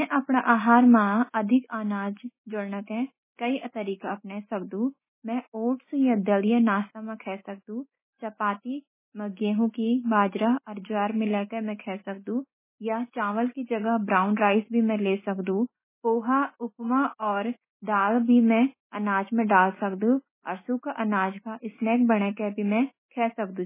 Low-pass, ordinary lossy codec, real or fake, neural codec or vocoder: 3.6 kHz; MP3, 16 kbps; real; none